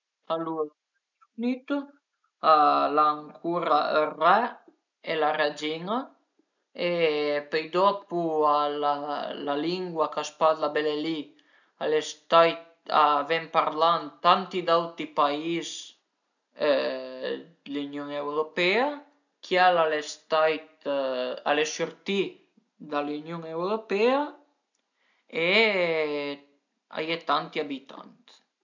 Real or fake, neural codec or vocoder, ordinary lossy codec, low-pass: real; none; none; 7.2 kHz